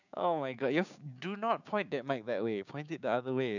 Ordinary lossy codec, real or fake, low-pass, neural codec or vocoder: none; fake; 7.2 kHz; codec, 16 kHz, 6 kbps, DAC